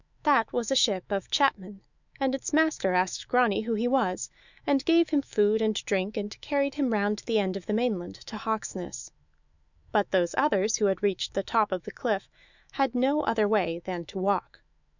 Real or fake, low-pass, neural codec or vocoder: fake; 7.2 kHz; autoencoder, 48 kHz, 128 numbers a frame, DAC-VAE, trained on Japanese speech